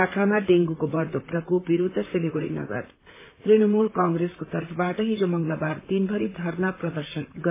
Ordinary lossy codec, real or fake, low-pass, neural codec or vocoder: MP3, 16 kbps; fake; 3.6 kHz; vocoder, 44.1 kHz, 128 mel bands, Pupu-Vocoder